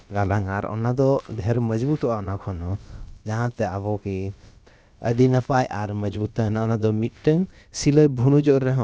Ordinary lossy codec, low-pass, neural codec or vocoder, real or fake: none; none; codec, 16 kHz, about 1 kbps, DyCAST, with the encoder's durations; fake